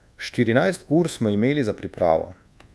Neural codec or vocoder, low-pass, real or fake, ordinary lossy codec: codec, 24 kHz, 1.2 kbps, DualCodec; none; fake; none